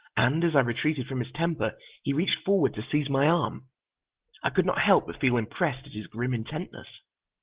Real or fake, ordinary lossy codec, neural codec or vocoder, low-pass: real; Opus, 16 kbps; none; 3.6 kHz